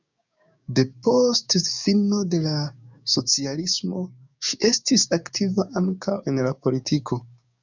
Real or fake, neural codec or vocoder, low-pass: fake; codec, 16 kHz, 6 kbps, DAC; 7.2 kHz